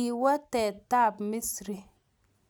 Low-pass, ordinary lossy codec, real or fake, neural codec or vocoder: none; none; real; none